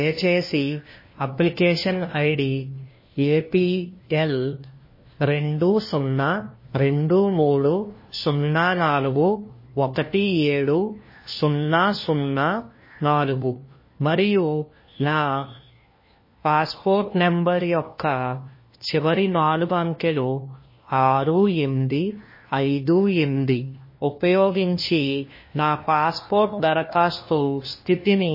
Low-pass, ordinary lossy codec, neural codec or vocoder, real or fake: 5.4 kHz; MP3, 24 kbps; codec, 16 kHz, 1 kbps, FunCodec, trained on LibriTTS, 50 frames a second; fake